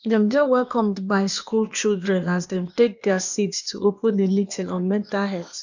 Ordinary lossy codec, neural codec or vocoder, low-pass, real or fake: none; codec, 16 kHz, 0.8 kbps, ZipCodec; 7.2 kHz; fake